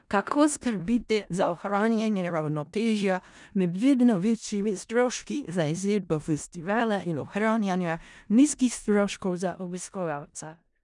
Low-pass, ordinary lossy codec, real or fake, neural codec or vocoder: 10.8 kHz; none; fake; codec, 16 kHz in and 24 kHz out, 0.4 kbps, LongCat-Audio-Codec, four codebook decoder